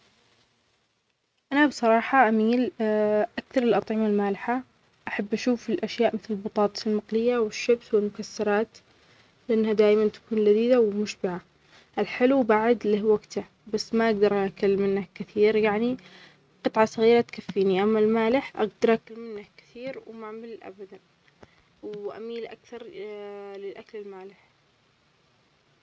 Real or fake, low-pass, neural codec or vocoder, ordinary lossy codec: real; none; none; none